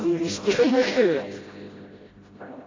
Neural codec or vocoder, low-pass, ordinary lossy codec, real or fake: codec, 16 kHz, 0.5 kbps, FreqCodec, smaller model; 7.2 kHz; AAC, 32 kbps; fake